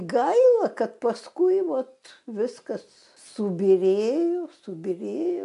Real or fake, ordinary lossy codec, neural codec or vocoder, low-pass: real; AAC, 64 kbps; none; 10.8 kHz